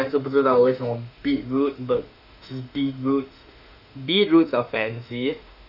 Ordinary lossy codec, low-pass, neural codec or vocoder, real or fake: none; 5.4 kHz; autoencoder, 48 kHz, 32 numbers a frame, DAC-VAE, trained on Japanese speech; fake